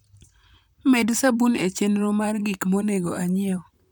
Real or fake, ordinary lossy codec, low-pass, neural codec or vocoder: fake; none; none; vocoder, 44.1 kHz, 128 mel bands, Pupu-Vocoder